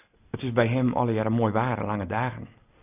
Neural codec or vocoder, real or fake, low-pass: none; real; 3.6 kHz